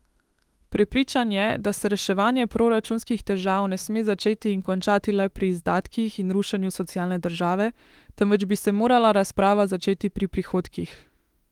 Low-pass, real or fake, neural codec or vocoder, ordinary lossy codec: 19.8 kHz; fake; autoencoder, 48 kHz, 32 numbers a frame, DAC-VAE, trained on Japanese speech; Opus, 24 kbps